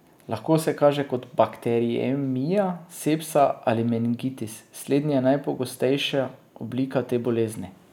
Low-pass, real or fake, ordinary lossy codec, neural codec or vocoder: 19.8 kHz; real; none; none